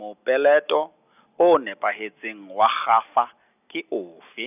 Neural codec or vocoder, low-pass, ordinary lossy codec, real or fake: none; 3.6 kHz; none; real